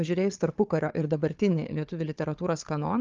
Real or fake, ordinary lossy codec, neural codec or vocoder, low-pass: fake; Opus, 32 kbps; codec, 16 kHz, 4 kbps, FunCodec, trained on Chinese and English, 50 frames a second; 7.2 kHz